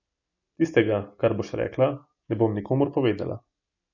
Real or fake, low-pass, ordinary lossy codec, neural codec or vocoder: real; 7.2 kHz; none; none